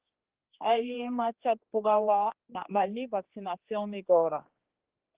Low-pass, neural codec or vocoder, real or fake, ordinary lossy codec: 3.6 kHz; codec, 16 kHz, 2 kbps, X-Codec, HuBERT features, trained on general audio; fake; Opus, 16 kbps